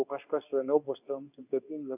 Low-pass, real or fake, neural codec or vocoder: 3.6 kHz; fake; codec, 24 kHz, 0.9 kbps, WavTokenizer, medium speech release version 2